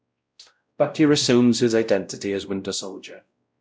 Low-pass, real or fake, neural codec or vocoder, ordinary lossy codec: none; fake; codec, 16 kHz, 0.5 kbps, X-Codec, WavLM features, trained on Multilingual LibriSpeech; none